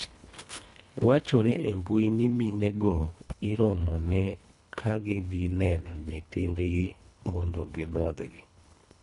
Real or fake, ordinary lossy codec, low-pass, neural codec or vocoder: fake; none; 10.8 kHz; codec, 24 kHz, 1.5 kbps, HILCodec